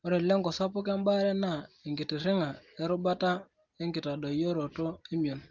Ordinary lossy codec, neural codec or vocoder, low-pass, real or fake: Opus, 32 kbps; none; 7.2 kHz; real